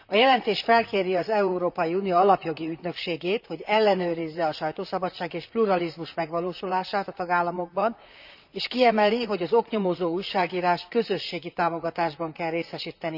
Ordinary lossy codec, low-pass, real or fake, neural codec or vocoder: none; 5.4 kHz; fake; vocoder, 44.1 kHz, 128 mel bands, Pupu-Vocoder